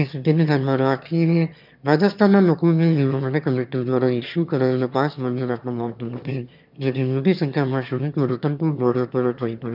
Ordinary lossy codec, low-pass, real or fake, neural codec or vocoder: none; 5.4 kHz; fake; autoencoder, 22.05 kHz, a latent of 192 numbers a frame, VITS, trained on one speaker